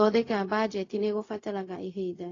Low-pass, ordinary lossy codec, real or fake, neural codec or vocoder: 7.2 kHz; AAC, 32 kbps; fake; codec, 16 kHz, 0.4 kbps, LongCat-Audio-Codec